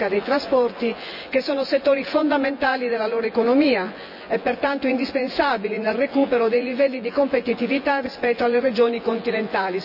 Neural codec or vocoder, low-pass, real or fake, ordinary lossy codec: vocoder, 24 kHz, 100 mel bands, Vocos; 5.4 kHz; fake; none